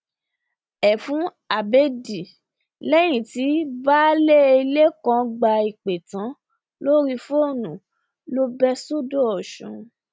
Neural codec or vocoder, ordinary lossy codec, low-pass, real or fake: none; none; none; real